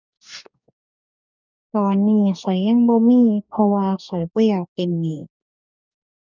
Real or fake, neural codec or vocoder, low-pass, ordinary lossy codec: fake; codec, 44.1 kHz, 2.6 kbps, DAC; 7.2 kHz; none